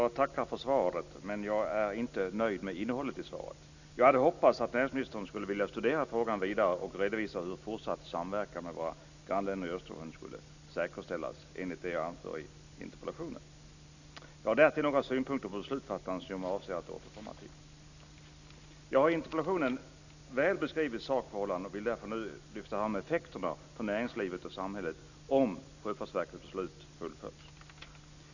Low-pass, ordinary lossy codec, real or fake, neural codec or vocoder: 7.2 kHz; none; real; none